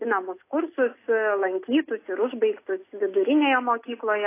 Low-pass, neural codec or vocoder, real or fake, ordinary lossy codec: 3.6 kHz; none; real; AAC, 24 kbps